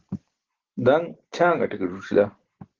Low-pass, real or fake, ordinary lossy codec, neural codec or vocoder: 7.2 kHz; real; Opus, 16 kbps; none